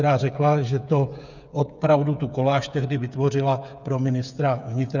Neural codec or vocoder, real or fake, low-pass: codec, 16 kHz, 8 kbps, FreqCodec, smaller model; fake; 7.2 kHz